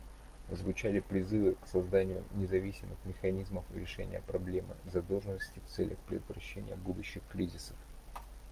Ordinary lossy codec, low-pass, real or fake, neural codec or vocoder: Opus, 32 kbps; 14.4 kHz; real; none